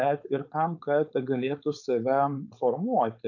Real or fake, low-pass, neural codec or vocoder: fake; 7.2 kHz; codec, 16 kHz, 4.8 kbps, FACodec